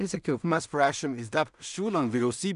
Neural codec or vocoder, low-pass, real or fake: codec, 16 kHz in and 24 kHz out, 0.4 kbps, LongCat-Audio-Codec, two codebook decoder; 10.8 kHz; fake